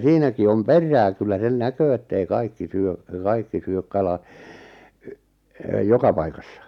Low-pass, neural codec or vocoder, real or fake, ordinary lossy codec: 19.8 kHz; none; real; none